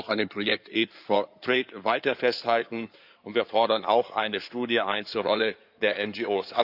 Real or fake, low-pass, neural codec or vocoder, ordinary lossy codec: fake; 5.4 kHz; codec, 16 kHz in and 24 kHz out, 2.2 kbps, FireRedTTS-2 codec; none